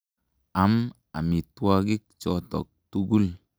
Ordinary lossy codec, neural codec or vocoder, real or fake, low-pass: none; none; real; none